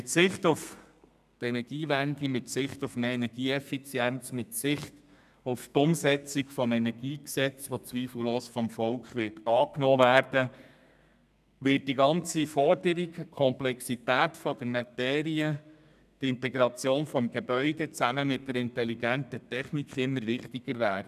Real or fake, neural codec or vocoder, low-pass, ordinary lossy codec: fake; codec, 32 kHz, 1.9 kbps, SNAC; 14.4 kHz; none